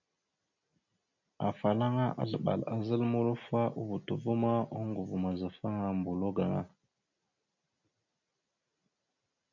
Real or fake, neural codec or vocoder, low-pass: real; none; 7.2 kHz